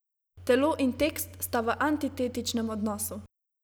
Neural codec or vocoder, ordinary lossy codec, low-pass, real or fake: none; none; none; real